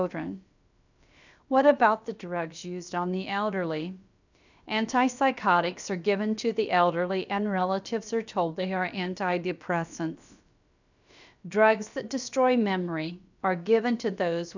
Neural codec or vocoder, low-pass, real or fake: codec, 16 kHz, about 1 kbps, DyCAST, with the encoder's durations; 7.2 kHz; fake